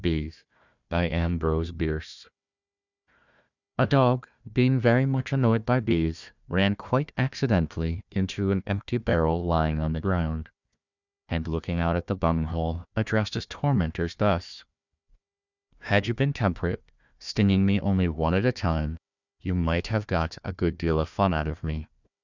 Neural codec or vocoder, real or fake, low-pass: codec, 16 kHz, 1 kbps, FunCodec, trained on Chinese and English, 50 frames a second; fake; 7.2 kHz